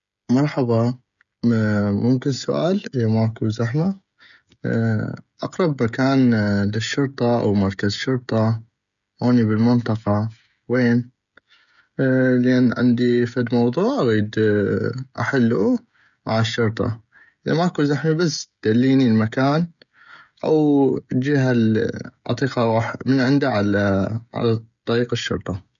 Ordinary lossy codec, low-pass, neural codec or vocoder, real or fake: none; 7.2 kHz; codec, 16 kHz, 16 kbps, FreqCodec, smaller model; fake